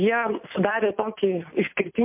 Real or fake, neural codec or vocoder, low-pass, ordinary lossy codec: real; none; 3.6 kHz; MP3, 32 kbps